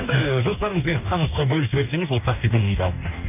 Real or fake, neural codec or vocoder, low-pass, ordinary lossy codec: fake; codec, 44.1 kHz, 2.6 kbps, DAC; 3.6 kHz; none